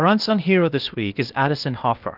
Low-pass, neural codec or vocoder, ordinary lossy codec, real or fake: 5.4 kHz; codec, 16 kHz, 0.8 kbps, ZipCodec; Opus, 32 kbps; fake